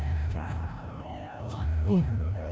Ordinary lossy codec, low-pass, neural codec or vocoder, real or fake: none; none; codec, 16 kHz, 0.5 kbps, FreqCodec, larger model; fake